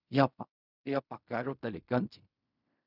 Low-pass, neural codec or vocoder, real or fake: 5.4 kHz; codec, 16 kHz in and 24 kHz out, 0.4 kbps, LongCat-Audio-Codec, fine tuned four codebook decoder; fake